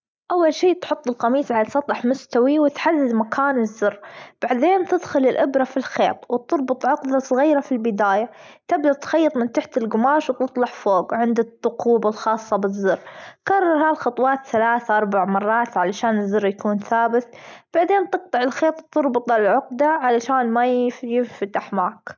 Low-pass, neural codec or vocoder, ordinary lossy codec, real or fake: none; none; none; real